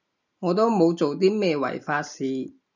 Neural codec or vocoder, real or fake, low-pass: none; real; 7.2 kHz